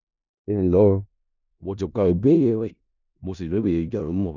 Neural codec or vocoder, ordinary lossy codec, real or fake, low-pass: codec, 16 kHz in and 24 kHz out, 0.4 kbps, LongCat-Audio-Codec, four codebook decoder; none; fake; 7.2 kHz